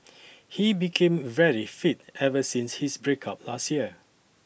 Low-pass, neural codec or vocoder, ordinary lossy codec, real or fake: none; none; none; real